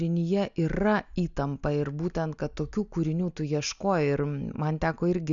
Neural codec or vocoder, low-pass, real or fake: none; 7.2 kHz; real